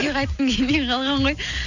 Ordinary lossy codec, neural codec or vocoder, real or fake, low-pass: none; none; real; 7.2 kHz